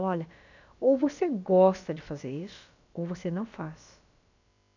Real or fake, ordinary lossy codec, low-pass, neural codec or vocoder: fake; none; 7.2 kHz; codec, 16 kHz, about 1 kbps, DyCAST, with the encoder's durations